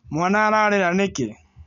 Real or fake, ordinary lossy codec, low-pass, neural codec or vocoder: real; none; 7.2 kHz; none